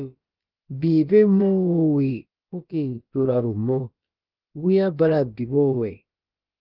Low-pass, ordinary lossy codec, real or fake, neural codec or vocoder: 5.4 kHz; Opus, 32 kbps; fake; codec, 16 kHz, about 1 kbps, DyCAST, with the encoder's durations